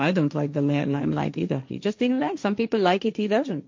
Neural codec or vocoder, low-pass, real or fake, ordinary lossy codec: codec, 16 kHz, 1.1 kbps, Voila-Tokenizer; 7.2 kHz; fake; MP3, 48 kbps